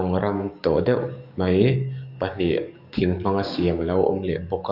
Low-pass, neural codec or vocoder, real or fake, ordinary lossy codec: 5.4 kHz; codec, 44.1 kHz, 7.8 kbps, DAC; fake; none